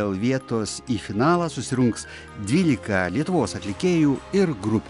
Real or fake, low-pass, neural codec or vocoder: real; 10.8 kHz; none